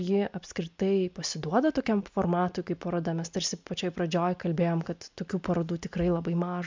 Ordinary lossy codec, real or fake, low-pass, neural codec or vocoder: MP3, 64 kbps; real; 7.2 kHz; none